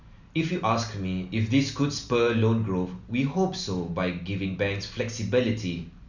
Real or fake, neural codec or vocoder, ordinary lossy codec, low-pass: real; none; none; 7.2 kHz